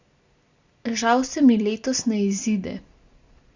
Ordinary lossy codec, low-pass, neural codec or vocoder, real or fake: Opus, 64 kbps; 7.2 kHz; none; real